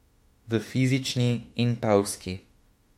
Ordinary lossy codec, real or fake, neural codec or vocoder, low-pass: MP3, 64 kbps; fake; autoencoder, 48 kHz, 32 numbers a frame, DAC-VAE, trained on Japanese speech; 19.8 kHz